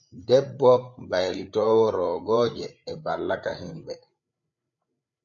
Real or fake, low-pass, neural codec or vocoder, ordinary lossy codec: fake; 7.2 kHz; codec, 16 kHz, 8 kbps, FreqCodec, larger model; MP3, 64 kbps